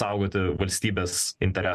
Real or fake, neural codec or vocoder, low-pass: real; none; 14.4 kHz